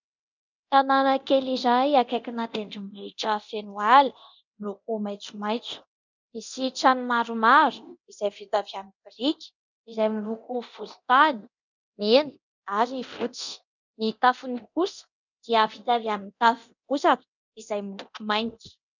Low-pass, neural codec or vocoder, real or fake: 7.2 kHz; codec, 24 kHz, 0.9 kbps, DualCodec; fake